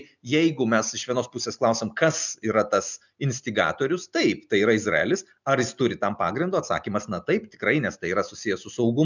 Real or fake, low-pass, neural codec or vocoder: real; 7.2 kHz; none